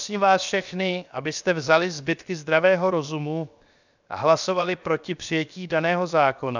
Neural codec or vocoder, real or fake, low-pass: codec, 16 kHz, 0.7 kbps, FocalCodec; fake; 7.2 kHz